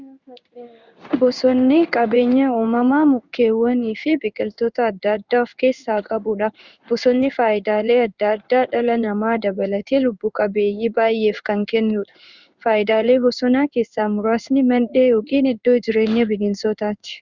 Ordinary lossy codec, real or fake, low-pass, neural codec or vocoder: Opus, 64 kbps; fake; 7.2 kHz; codec, 16 kHz in and 24 kHz out, 1 kbps, XY-Tokenizer